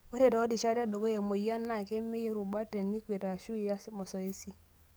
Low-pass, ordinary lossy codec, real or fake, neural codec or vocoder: none; none; fake; codec, 44.1 kHz, 7.8 kbps, DAC